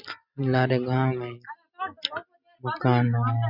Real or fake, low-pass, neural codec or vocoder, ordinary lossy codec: real; 5.4 kHz; none; none